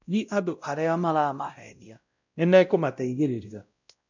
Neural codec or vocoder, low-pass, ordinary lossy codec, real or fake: codec, 16 kHz, 0.5 kbps, X-Codec, WavLM features, trained on Multilingual LibriSpeech; 7.2 kHz; none; fake